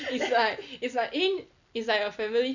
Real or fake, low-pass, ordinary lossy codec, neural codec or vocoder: real; 7.2 kHz; none; none